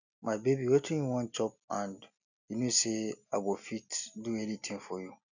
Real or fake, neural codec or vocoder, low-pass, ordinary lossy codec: real; none; 7.2 kHz; none